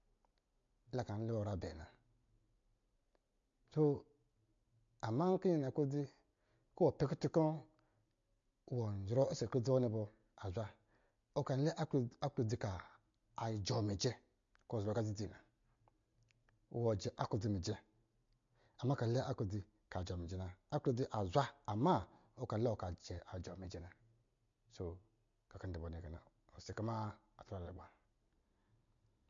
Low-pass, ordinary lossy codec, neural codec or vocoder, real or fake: 7.2 kHz; MP3, 48 kbps; none; real